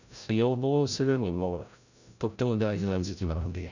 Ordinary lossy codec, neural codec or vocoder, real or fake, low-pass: none; codec, 16 kHz, 0.5 kbps, FreqCodec, larger model; fake; 7.2 kHz